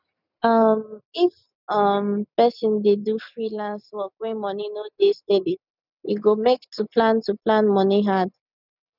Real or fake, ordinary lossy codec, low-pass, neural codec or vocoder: real; none; 5.4 kHz; none